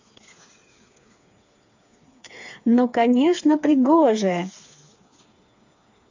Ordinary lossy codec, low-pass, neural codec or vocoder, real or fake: AAC, 48 kbps; 7.2 kHz; codec, 16 kHz, 4 kbps, FreqCodec, smaller model; fake